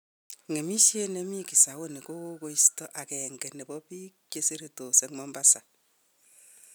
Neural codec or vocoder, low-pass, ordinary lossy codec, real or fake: none; none; none; real